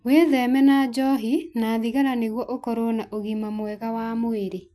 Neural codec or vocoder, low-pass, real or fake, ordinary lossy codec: none; none; real; none